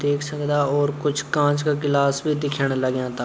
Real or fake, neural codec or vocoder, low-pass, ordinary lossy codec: real; none; none; none